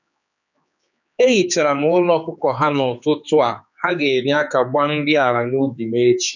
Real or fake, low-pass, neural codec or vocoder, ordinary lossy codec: fake; 7.2 kHz; codec, 16 kHz, 4 kbps, X-Codec, HuBERT features, trained on general audio; none